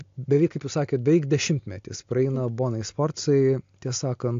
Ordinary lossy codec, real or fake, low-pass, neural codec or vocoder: AAC, 48 kbps; real; 7.2 kHz; none